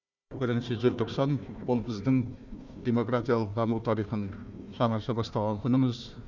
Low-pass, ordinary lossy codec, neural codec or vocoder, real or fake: 7.2 kHz; none; codec, 16 kHz, 1 kbps, FunCodec, trained on Chinese and English, 50 frames a second; fake